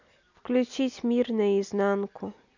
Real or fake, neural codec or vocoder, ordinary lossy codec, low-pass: real; none; none; 7.2 kHz